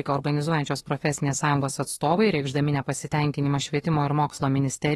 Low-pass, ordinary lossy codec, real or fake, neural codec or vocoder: 19.8 kHz; AAC, 32 kbps; fake; autoencoder, 48 kHz, 32 numbers a frame, DAC-VAE, trained on Japanese speech